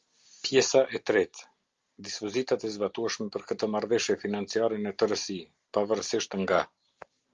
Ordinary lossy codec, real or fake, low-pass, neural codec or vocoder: Opus, 32 kbps; real; 7.2 kHz; none